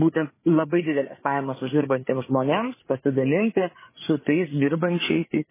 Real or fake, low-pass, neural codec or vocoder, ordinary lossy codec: fake; 3.6 kHz; codec, 16 kHz, 4 kbps, FreqCodec, larger model; MP3, 16 kbps